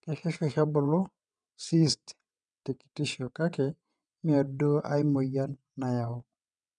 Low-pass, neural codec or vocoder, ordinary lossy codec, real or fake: 9.9 kHz; vocoder, 22.05 kHz, 80 mel bands, WaveNeXt; none; fake